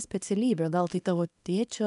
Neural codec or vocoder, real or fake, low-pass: codec, 24 kHz, 0.9 kbps, WavTokenizer, small release; fake; 10.8 kHz